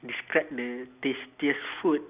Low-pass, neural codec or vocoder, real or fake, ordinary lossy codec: 3.6 kHz; none; real; Opus, 64 kbps